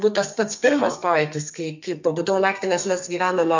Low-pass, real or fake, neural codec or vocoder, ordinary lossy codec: 7.2 kHz; fake; codec, 32 kHz, 1.9 kbps, SNAC; MP3, 64 kbps